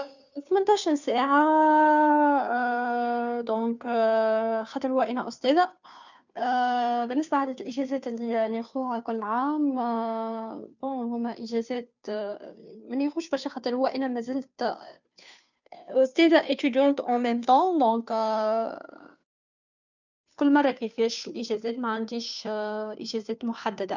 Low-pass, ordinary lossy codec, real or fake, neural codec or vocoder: 7.2 kHz; none; fake; codec, 16 kHz, 2 kbps, FunCodec, trained on Chinese and English, 25 frames a second